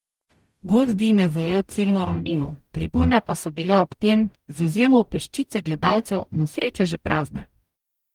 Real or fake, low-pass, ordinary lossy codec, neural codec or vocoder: fake; 19.8 kHz; Opus, 32 kbps; codec, 44.1 kHz, 0.9 kbps, DAC